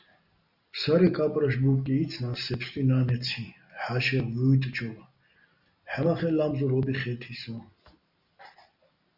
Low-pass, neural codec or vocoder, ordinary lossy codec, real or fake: 5.4 kHz; none; Opus, 64 kbps; real